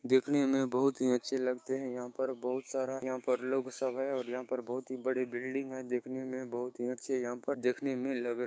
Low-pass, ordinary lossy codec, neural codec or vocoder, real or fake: none; none; codec, 16 kHz, 6 kbps, DAC; fake